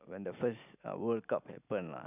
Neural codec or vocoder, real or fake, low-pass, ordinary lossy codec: none; real; 3.6 kHz; none